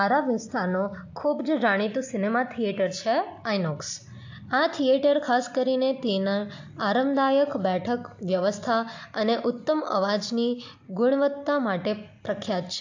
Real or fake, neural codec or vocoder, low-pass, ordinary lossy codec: real; none; 7.2 kHz; AAC, 48 kbps